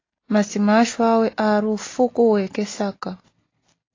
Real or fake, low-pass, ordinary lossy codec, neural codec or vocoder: real; 7.2 kHz; AAC, 32 kbps; none